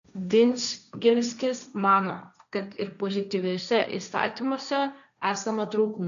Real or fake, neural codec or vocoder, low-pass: fake; codec, 16 kHz, 1.1 kbps, Voila-Tokenizer; 7.2 kHz